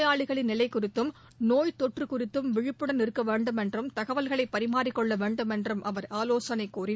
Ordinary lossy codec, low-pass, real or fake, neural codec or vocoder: none; none; real; none